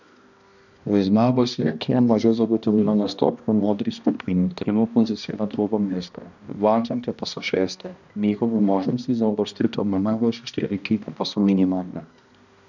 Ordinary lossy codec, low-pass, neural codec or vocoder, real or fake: none; 7.2 kHz; codec, 16 kHz, 1 kbps, X-Codec, HuBERT features, trained on balanced general audio; fake